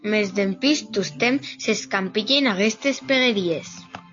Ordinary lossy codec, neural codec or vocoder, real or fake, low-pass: AAC, 48 kbps; none; real; 7.2 kHz